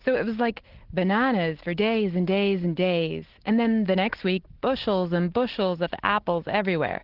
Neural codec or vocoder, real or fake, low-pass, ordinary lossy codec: none; real; 5.4 kHz; Opus, 16 kbps